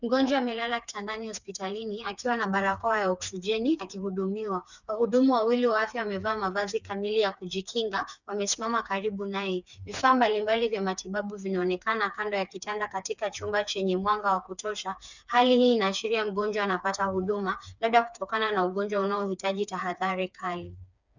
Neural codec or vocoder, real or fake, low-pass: codec, 16 kHz, 4 kbps, FreqCodec, smaller model; fake; 7.2 kHz